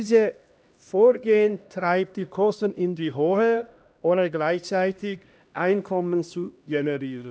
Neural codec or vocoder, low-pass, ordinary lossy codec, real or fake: codec, 16 kHz, 1 kbps, X-Codec, HuBERT features, trained on LibriSpeech; none; none; fake